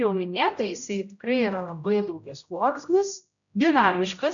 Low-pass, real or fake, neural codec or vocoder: 7.2 kHz; fake; codec, 16 kHz, 0.5 kbps, X-Codec, HuBERT features, trained on general audio